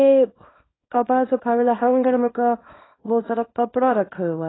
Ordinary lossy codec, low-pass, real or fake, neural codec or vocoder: AAC, 16 kbps; 7.2 kHz; fake; codec, 24 kHz, 0.9 kbps, WavTokenizer, small release